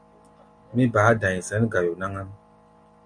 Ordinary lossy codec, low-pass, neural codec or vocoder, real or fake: Opus, 64 kbps; 9.9 kHz; none; real